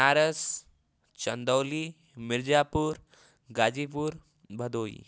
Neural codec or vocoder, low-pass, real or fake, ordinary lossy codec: none; none; real; none